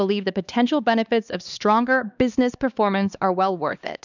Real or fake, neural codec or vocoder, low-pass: fake; codec, 16 kHz, 2 kbps, X-Codec, HuBERT features, trained on LibriSpeech; 7.2 kHz